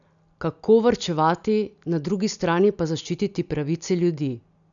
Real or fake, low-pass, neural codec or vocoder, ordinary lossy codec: real; 7.2 kHz; none; none